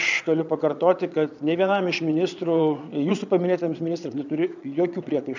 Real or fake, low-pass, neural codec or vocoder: fake; 7.2 kHz; vocoder, 22.05 kHz, 80 mel bands, Vocos